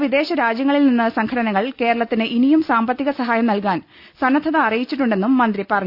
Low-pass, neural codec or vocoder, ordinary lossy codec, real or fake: 5.4 kHz; none; Opus, 64 kbps; real